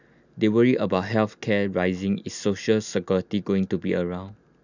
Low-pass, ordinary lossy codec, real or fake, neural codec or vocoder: 7.2 kHz; none; real; none